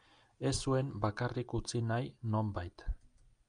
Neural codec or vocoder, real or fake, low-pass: none; real; 9.9 kHz